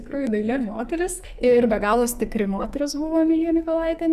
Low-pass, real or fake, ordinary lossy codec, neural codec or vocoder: 14.4 kHz; fake; Opus, 64 kbps; codec, 44.1 kHz, 2.6 kbps, SNAC